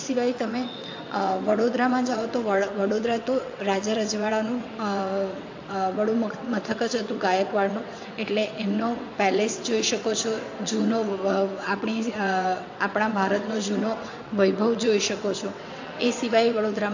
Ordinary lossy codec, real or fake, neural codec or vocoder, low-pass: AAC, 48 kbps; fake; vocoder, 22.05 kHz, 80 mel bands, WaveNeXt; 7.2 kHz